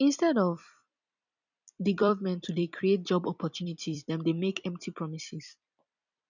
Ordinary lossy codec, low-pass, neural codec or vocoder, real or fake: none; 7.2 kHz; vocoder, 44.1 kHz, 128 mel bands every 256 samples, BigVGAN v2; fake